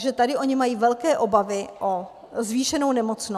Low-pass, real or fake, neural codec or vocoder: 14.4 kHz; real; none